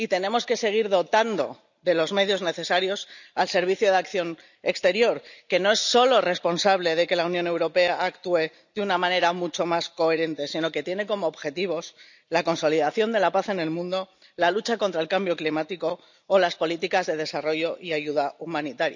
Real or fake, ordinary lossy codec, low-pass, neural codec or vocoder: real; none; 7.2 kHz; none